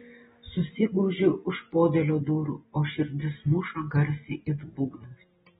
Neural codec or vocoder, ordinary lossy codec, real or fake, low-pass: none; AAC, 16 kbps; real; 10.8 kHz